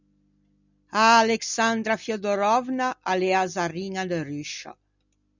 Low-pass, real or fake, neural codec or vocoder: 7.2 kHz; real; none